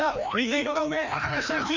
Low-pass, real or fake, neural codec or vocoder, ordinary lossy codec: 7.2 kHz; fake; codec, 16 kHz, 1 kbps, FreqCodec, larger model; none